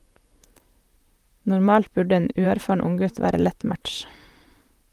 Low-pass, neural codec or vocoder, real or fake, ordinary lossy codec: 14.4 kHz; vocoder, 44.1 kHz, 128 mel bands, Pupu-Vocoder; fake; Opus, 32 kbps